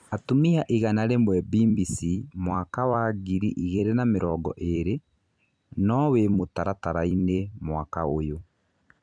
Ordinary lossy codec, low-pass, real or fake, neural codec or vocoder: none; 9.9 kHz; fake; vocoder, 44.1 kHz, 128 mel bands every 256 samples, BigVGAN v2